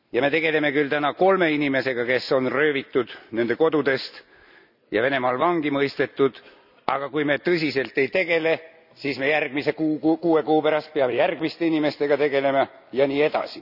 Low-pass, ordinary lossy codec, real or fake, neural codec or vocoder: 5.4 kHz; none; real; none